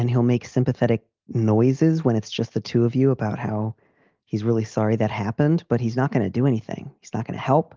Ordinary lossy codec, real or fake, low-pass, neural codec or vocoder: Opus, 32 kbps; real; 7.2 kHz; none